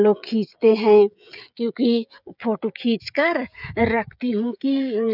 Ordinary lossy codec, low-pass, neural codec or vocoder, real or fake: none; 5.4 kHz; codec, 16 kHz, 16 kbps, FreqCodec, smaller model; fake